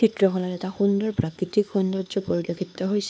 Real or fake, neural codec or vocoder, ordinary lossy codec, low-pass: fake; codec, 16 kHz, 4 kbps, X-Codec, HuBERT features, trained on LibriSpeech; none; none